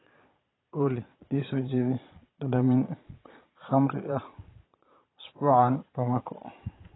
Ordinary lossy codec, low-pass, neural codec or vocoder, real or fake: AAC, 16 kbps; 7.2 kHz; vocoder, 22.05 kHz, 80 mel bands, Vocos; fake